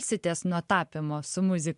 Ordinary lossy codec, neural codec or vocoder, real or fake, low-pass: MP3, 64 kbps; none; real; 10.8 kHz